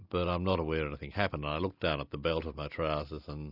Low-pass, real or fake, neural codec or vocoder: 5.4 kHz; real; none